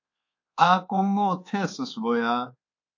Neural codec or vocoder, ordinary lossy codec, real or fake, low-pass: codec, 24 kHz, 1.2 kbps, DualCodec; AAC, 48 kbps; fake; 7.2 kHz